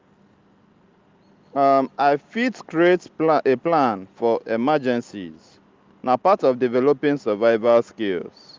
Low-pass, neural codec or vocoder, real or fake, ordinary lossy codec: 7.2 kHz; none; real; Opus, 24 kbps